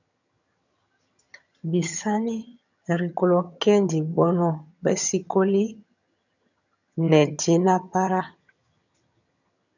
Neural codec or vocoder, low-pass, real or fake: vocoder, 22.05 kHz, 80 mel bands, HiFi-GAN; 7.2 kHz; fake